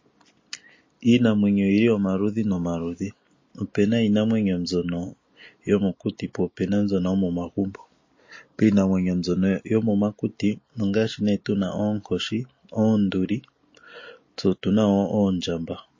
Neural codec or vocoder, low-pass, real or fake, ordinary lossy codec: none; 7.2 kHz; real; MP3, 32 kbps